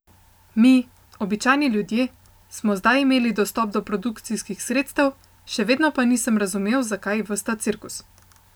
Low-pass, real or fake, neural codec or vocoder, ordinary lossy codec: none; real; none; none